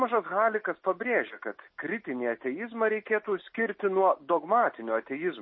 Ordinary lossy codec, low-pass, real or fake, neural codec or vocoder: MP3, 24 kbps; 7.2 kHz; real; none